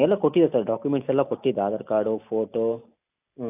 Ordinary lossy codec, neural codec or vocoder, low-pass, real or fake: AAC, 32 kbps; none; 3.6 kHz; real